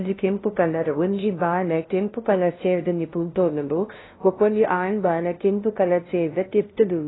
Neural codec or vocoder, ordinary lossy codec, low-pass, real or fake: codec, 16 kHz, 0.5 kbps, FunCodec, trained on LibriTTS, 25 frames a second; AAC, 16 kbps; 7.2 kHz; fake